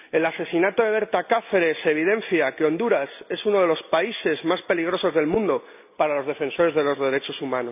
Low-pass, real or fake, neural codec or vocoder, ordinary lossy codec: 3.6 kHz; real; none; none